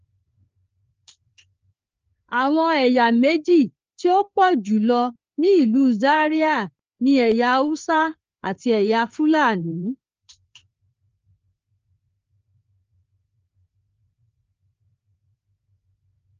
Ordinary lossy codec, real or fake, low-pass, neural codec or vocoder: Opus, 24 kbps; fake; 7.2 kHz; codec, 16 kHz, 4 kbps, FreqCodec, larger model